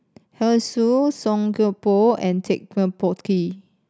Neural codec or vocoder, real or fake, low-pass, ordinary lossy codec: none; real; none; none